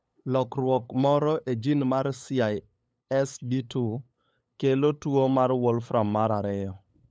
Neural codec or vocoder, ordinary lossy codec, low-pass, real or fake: codec, 16 kHz, 8 kbps, FunCodec, trained on LibriTTS, 25 frames a second; none; none; fake